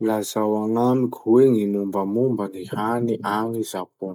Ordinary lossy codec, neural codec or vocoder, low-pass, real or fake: none; none; 19.8 kHz; real